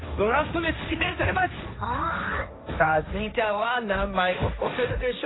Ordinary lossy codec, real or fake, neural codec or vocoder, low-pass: AAC, 16 kbps; fake; codec, 16 kHz, 1.1 kbps, Voila-Tokenizer; 7.2 kHz